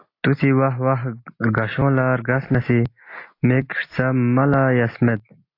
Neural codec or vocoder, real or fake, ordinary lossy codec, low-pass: none; real; AAC, 32 kbps; 5.4 kHz